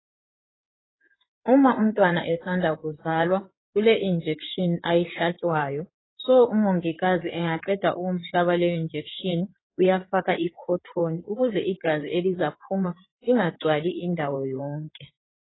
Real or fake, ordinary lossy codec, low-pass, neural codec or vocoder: fake; AAC, 16 kbps; 7.2 kHz; codec, 16 kHz in and 24 kHz out, 2.2 kbps, FireRedTTS-2 codec